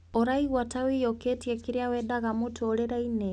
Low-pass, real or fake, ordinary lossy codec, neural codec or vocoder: none; real; none; none